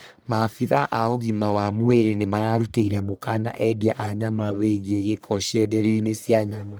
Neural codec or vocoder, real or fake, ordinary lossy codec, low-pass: codec, 44.1 kHz, 1.7 kbps, Pupu-Codec; fake; none; none